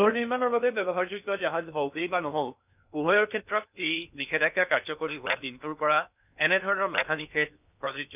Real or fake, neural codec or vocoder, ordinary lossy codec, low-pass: fake; codec, 16 kHz in and 24 kHz out, 0.8 kbps, FocalCodec, streaming, 65536 codes; none; 3.6 kHz